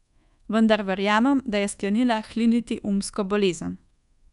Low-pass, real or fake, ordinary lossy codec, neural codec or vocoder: 10.8 kHz; fake; none; codec, 24 kHz, 1.2 kbps, DualCodec